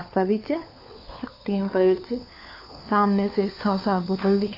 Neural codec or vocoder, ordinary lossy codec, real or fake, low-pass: codec, 16 kHz, 4 kbps, FunCodec, trained on LibriTTS, 50 frames a second; AAC, 32 kbps; fake; 5.4 kHz